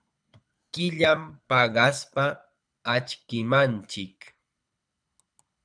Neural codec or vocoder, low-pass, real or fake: codec, 24 kHz, 6 kbps, HILCodec; 9.9 kHz; fake